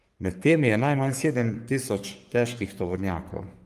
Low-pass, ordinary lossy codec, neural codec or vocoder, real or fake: 14.4 kHz; Opus, 32 kbps; codec, 44.1 kHz, 3.4 kbps, Pupu-Codec; fake